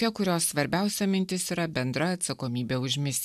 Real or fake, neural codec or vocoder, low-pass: real; none; 14.4 kHz